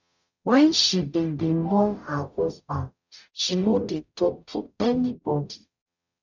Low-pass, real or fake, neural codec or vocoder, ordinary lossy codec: 7.2 kHz; fake; codec, 44.1 kHz, 0.9 kbps, DAC; none